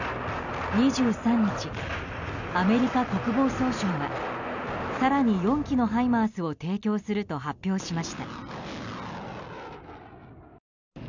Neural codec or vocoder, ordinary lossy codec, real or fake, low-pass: none; none; real; 7.2 kHz